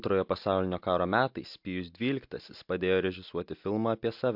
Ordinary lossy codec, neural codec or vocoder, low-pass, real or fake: MP3, 48 kbps; none; 5.4 kHz; real